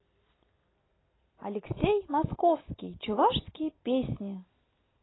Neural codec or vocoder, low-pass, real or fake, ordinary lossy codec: none; 7.2 kHz; real; AAC, 16 kbps